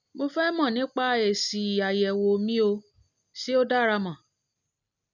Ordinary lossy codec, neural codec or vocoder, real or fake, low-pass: none; none; real; 7.2 kHz